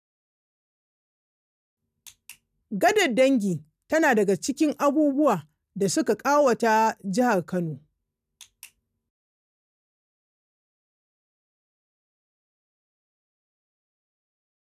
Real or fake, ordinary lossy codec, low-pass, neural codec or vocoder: real; none; 14.4 kHz; none